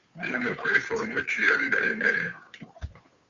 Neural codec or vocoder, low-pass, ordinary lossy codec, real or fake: codec, 16 kHz, 2 kbps, FunCodec, trained on Chinese and English, 25 frames a second; 7.2 kHz; Opus, 64 kbps; fake